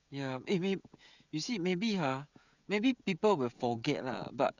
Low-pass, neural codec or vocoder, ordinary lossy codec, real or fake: 7.2 kHz; codec, 16 kHz, 16 kbps, FreqCodec, smaller model; none; fake